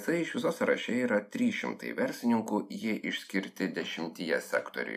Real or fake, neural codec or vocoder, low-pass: real; none; 14.4 kHz